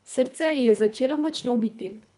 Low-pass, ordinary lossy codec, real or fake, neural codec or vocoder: 10.8 kHz; none; fake; codec, 24 kHz, 1.5 kbps, HILCodec